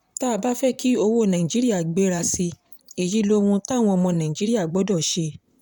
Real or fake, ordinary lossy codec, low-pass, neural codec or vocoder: fake; none; 19.8 kHz; vocoder, 44.1 kHz, 128 mel bands, Pupu-Vocoder